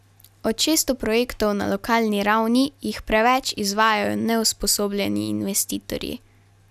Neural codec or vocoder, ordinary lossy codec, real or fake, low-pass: none; none; real; 14.4 kHz